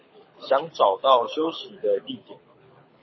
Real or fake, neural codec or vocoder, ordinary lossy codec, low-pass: fake; codec, 24 kHz, 6 kbps, HILCodec; MP3, 24 kbps; 7.2 kHz